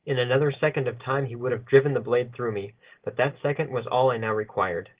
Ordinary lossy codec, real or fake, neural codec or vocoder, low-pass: Opus, 16 kbps; real; none; 3.6 kHz